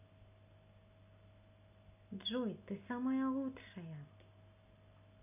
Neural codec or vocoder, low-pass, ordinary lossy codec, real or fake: none; 3.6 kHz; none; real